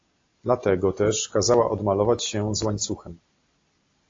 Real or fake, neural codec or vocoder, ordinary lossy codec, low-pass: real; none; AAC, 32 kbps; 7.2 kHz